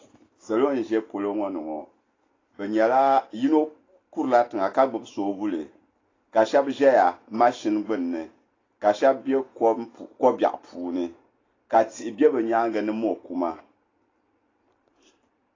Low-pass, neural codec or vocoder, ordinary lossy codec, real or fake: 7.2 kHz; none; AAC, 32 kbps; real